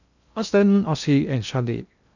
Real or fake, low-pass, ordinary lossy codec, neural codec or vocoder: fake; 7.2 kHz; none; codec, 16 kHz in and 24 kHz out, 0.6 kbps, FocalCodec, streaming, 2048 codes